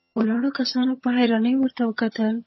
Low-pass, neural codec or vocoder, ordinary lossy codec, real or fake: 7.2 kHz; vocoder, 22.05 kHz, 80 mel bands, HiFi-GAN; MP3, 24 kbps; fake